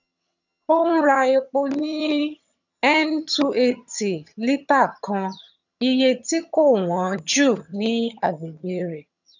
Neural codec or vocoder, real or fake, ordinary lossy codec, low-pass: vocoder, 22.05 kHz, 80 mel bands, HiFi-GAN; fake; none; 7.2 kHz